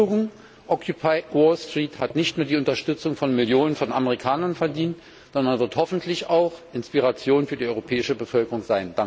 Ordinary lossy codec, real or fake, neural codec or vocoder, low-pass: none; real; none; none